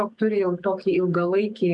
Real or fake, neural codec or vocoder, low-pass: fake; codec, 44.1 kHz, 7.8 kbps, DAC; 10.8 kHz